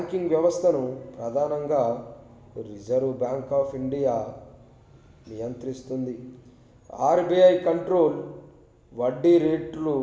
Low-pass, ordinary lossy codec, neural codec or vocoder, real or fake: none; none; none; real